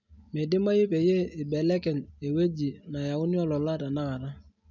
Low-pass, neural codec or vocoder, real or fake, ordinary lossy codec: 7.2 kHz; none; real; none